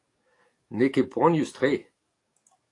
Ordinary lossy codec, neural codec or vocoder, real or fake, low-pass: AAC, 48 kbps; codec, 44.1 kHz, 7.8 kbps, DAC; fake; 10.8 kHz